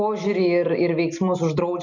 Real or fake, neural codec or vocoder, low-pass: real; none; 7.2 kHz